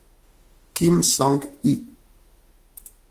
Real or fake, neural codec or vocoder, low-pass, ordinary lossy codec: fake; autoencoder, 48 kHz, 32 numbers a frame, DAC-VAE, trained on Japanese speech; 14.4 kHz; Opus, 24 kbps